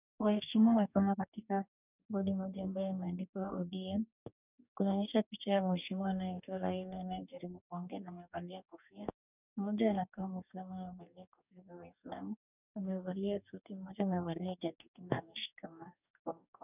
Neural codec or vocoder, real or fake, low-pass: codec, 44.1 kHz, 2.6 kbps, DAC; fake; 3.6 kHz